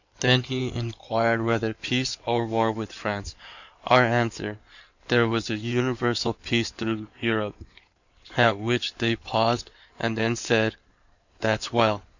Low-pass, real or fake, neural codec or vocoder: 7.2 kHz; fake; codec, 16 kHz in and 24 kHz out, 2.2 kbps, FireRedTTS-2 codec